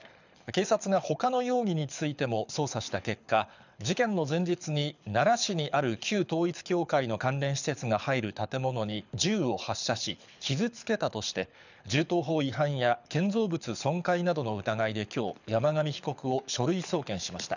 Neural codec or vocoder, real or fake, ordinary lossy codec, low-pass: codec, 24 kHz, 6 kbps, HILCodec; fake; none; 7.2 kHz